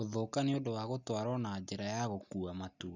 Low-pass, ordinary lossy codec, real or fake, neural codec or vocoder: 7.2 kHz; none; real; none